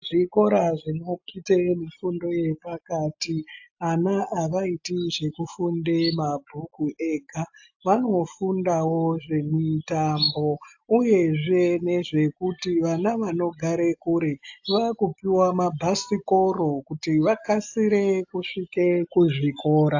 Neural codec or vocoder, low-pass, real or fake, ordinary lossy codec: none; 7.2 kHz; real; AAC, 48 kbps